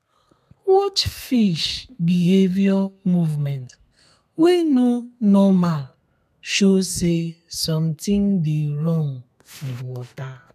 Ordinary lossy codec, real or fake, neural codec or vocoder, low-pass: none; fake; codec, 32 kHz, 1.9 kbps, SNAC; 14.4 kHz